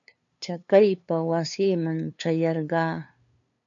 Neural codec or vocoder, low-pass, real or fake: codec, 16 kHz, 2 kbps, FunCodec, trained on LibriTTS, 25 frames a second; 7.2 kHz; fake